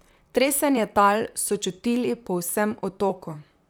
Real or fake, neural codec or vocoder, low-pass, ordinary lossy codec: fake; vocoder, 44.1 kHz, 128 mel bands, Pupu-Vocoder; none; none